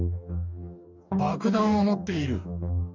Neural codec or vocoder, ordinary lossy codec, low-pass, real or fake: codec, 44.1 kHz, 2.6 kbps, DAC; none; 7.2 kHz; fake